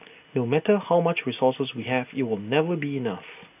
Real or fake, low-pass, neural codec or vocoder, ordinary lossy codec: real; 3.6 kHz; none; AAC, 32 kbps